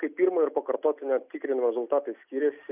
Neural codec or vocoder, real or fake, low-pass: none; real; 3.6 kHz